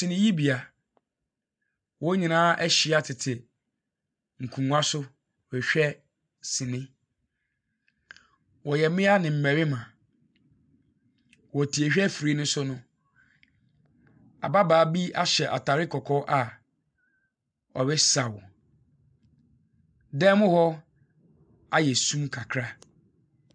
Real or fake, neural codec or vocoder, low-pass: real; none; 9.9 kHz